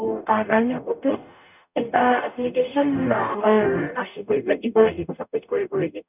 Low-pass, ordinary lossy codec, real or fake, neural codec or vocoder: 3.6 kHz; none; fake; codec, 44.1 kHz, 0.9 kbps, DAC